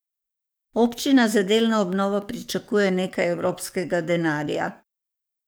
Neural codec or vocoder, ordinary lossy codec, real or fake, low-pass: codec, 44.1 kHz, 7.8 kbps, DAC; none; fake; none